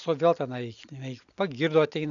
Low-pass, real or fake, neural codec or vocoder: 7.2 kHz; real; none